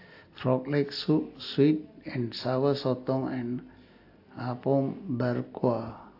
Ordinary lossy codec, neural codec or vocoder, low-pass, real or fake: AAC, 32 kbps; none; 5.4 kHz; real